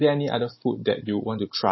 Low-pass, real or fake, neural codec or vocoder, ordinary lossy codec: 7.2 kHz; real; none; MP3, 24 kbps